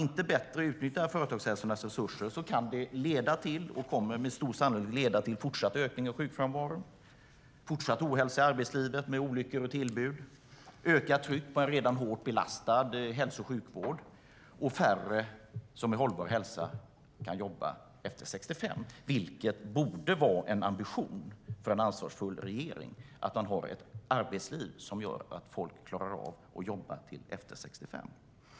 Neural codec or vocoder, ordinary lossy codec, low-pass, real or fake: none; none; none; real